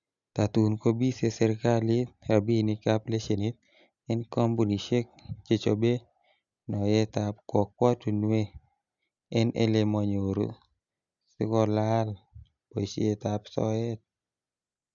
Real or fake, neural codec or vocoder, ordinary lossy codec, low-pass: real; none; none; 7.2 kHz